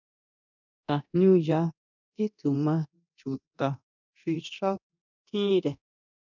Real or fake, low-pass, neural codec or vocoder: fake; 7.2 kHz; codec, 24 kHz, 0.9 kbps, DualCodec